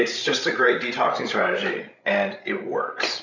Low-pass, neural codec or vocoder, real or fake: 7.2 kHz; codec, 16 kHz, 16 kbps, FreqCodec, larger model; fake